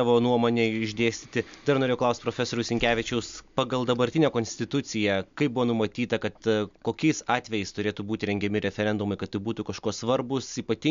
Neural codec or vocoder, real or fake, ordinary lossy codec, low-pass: none; real; MP3, 64 kbps; 7.2 kHz